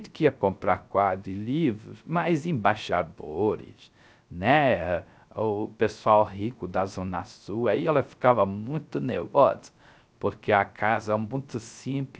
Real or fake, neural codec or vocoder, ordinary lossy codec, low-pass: fake; codec, 16 kHz, 0.3 kbps, FocalCodec; none; none